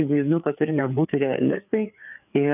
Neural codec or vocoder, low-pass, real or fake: codec, 16 kHz, 4 kbps, FreqCodec, larger model; 3.6 kHz; fake